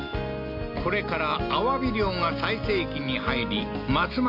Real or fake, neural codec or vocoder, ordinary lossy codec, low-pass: real; none; none; 5.4 kHz